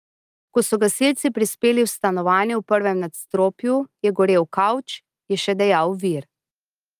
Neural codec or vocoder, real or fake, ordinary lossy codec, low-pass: none; real; Opus, 32 kbps; 14.4 kHz